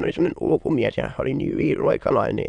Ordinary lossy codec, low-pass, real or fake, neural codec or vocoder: none; 9.9 kHz; fake; autoencoder, 22.05 kHz, a latent of 192 numbers a frame, VITS, trained on many speakers